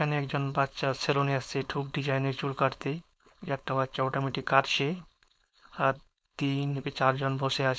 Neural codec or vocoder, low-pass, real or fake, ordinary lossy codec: codec, 16 kHz, 4.8 kbps, FACodec; none; fake; none